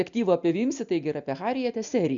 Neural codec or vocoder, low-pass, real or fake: none; 7.2 kHz; real